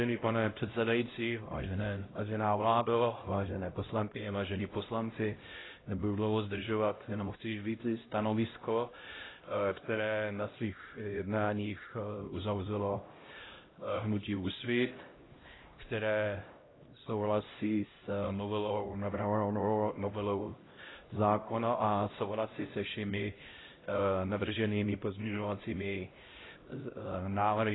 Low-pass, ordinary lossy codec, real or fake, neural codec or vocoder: 7.2 kHz; AAC, 16 kbps; fake; codec, 16 kHz, 0.5 kbps, X-Codec, HuBERT features, trained on LibriSpeech